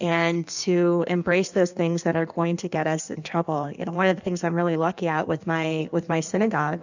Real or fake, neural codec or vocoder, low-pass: fake; codec, 16 kHz in and 24 kHz out, 1.1 kbps, FireRedTTS-2 codec; 7.2 kHz